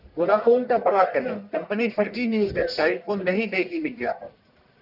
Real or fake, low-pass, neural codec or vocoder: fake; 5.4 kHz; codec, 44.1 kHz, 1.7 kbps, Pupu-Codec